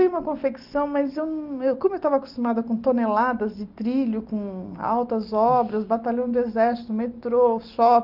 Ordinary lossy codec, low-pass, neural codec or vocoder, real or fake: Opus, 32 kbps; 5.4 kHz; none; real